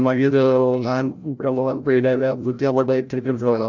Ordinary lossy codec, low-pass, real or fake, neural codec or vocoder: none; 7.2 kHz; fake; codec, 16 kHz, 0.5 kbps, FreqCodec, larger model